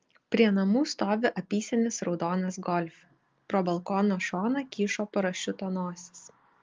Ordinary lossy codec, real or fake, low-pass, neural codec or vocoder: Opus, 32 kbps; real; 7.2 kHz; none